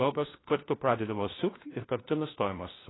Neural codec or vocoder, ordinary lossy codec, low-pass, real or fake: codec, 16 kHz, 1 kbps, FunCodec, trained on LibriTTS, 50 frames a second; AAC, 16 kbps; 7.2 kHz; fake